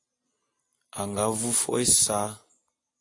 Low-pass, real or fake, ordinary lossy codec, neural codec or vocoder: 10.8 kHz; real; AAC, 48 kbps; none